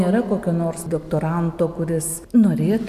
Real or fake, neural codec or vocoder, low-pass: real; none; 14.4 kHz